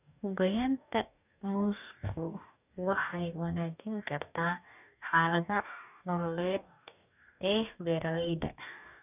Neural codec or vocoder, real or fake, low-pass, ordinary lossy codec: codec, 44.1 kHz, 2.6 kbps, DAC; fake; 3.6 kHz; none